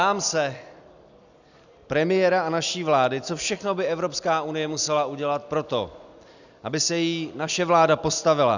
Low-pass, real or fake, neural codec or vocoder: 7.2 kHz; real; none